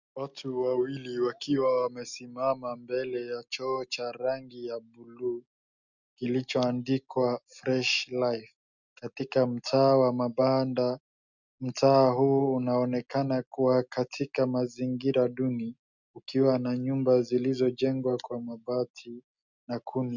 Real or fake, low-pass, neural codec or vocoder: real; 7.2 kHz; none